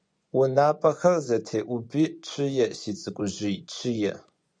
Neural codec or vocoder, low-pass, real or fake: vocoder, 24 kHz, 100 mel bands, Vocos; 9.9 kHz; fake